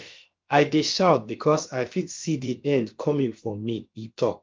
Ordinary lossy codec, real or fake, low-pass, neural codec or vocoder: Opus, 24 kbps; fake; 7.2 kHz; codec, 16 kHz, about 1 kbps, DyCAST, with the encoder's durations